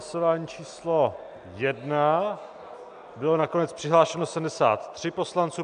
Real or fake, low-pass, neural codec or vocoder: real; 9.9 kHz; none